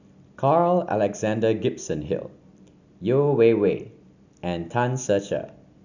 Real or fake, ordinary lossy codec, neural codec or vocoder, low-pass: real; none; none; 7.2 kHz